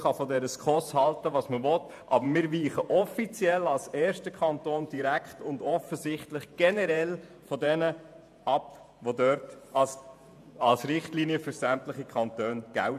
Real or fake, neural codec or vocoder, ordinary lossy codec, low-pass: fake; vocoder, 48 kHz, 128 mel bands, Vocos; AAC, 96 kbps; 14.4 kHz